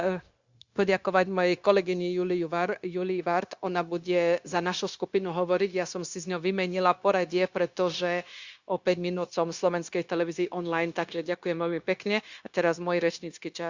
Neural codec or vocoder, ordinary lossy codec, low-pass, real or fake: codec, 16 kHz, 0.9 kbps, LongCat-Audio-Codec; Opus, 64 kbps; 7.2 kHz; fake